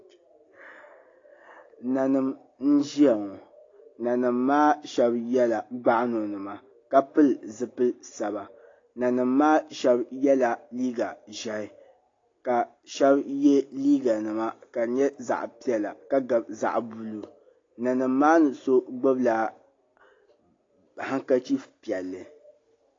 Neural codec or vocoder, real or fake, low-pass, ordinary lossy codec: none; real; 7.2 kHz; AAC, 32 kbps